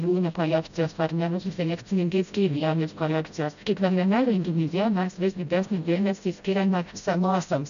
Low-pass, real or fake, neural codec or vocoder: 7.2 kHz; fake; codec, 16 kHz, 0.5 kbps, FreqCodec, smaller model